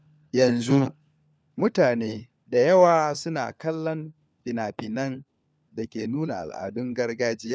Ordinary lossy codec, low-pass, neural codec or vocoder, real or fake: none; none; codec, 16 kHz, 4 kbps, FunCodec, trained on LibriTTS, 50 frames a second; fake